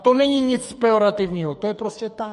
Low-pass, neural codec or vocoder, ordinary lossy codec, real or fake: 14.4 kHz; codec, 44.1 kHz, 2.6 kbps, SNAC; MP3, 48 kbps; fake